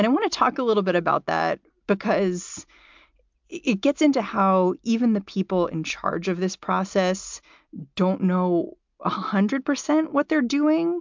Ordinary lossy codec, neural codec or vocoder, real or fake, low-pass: MP3, 64 kbps; none; real; 7.2 kHz